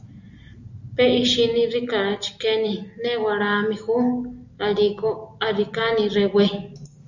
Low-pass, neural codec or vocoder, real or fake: 7.2 kHz; none; real